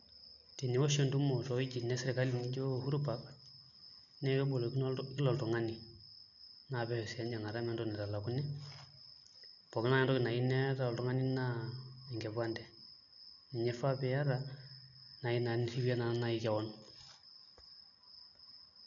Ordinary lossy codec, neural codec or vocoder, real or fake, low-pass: none; none; real; 7.2 kHz